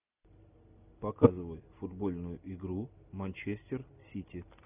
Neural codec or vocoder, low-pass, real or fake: none; 3.6 kHz; real